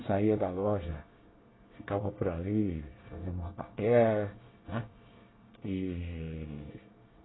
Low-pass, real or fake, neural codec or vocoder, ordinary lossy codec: 7.2 kHz; fake; codec, 24 kHz, 1 kbps, SNAC; AAC, 16 kbps